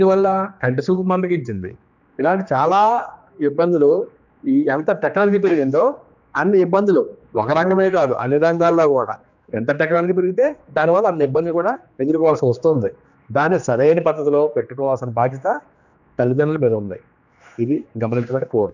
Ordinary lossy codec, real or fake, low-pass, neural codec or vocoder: none; fake; 7.2 kHz; codec, 16 kHz, 2 kbps, X-Codec, HuBERT features, trained on general audio